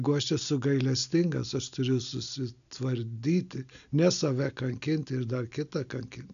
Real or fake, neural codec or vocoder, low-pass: real; none; 7.2 kHz